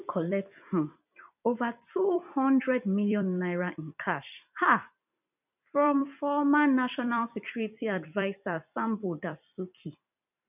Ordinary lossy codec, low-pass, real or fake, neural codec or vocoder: none; 3.6 kHz; fake; vocoder, 44.1 kHz, 128 mel bands every 256 samples, BigVGAN v2